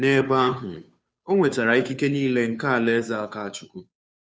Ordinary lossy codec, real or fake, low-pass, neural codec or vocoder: none; fake; none; codec, 16 kHz, 2 kbps, FunCodec, trained on Chinese and English, 25 frames a second